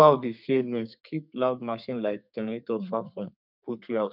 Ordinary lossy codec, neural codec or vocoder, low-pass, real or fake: none; codec, 32 kHz, 1.9 kbps, SNAC; 5.4 kHz; fake